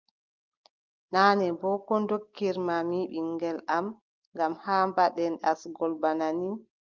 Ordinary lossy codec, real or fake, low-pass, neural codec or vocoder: Opus, 32 kbps; real; 7.2 kHz; none